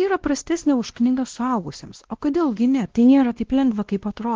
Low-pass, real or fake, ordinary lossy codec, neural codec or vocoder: 7.2 kHz; fake; Opus, 16 kbps; codec, 16 kHz, 1 kbps, X-Codec, WavLM features, trained on Multilingual LibriSpeech